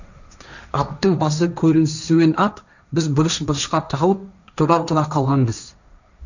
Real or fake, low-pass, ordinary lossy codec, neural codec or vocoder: fake; 7.2 kHz; none; codec, 16 kHz, 1.1 kbps, Voila-Tokenizer